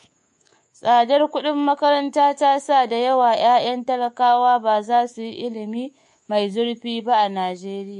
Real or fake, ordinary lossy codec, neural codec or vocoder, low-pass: fake; MP3, 48 kbps; codec, 24 kHz, 3.1 kbps, DualCodec; 10.8 kHz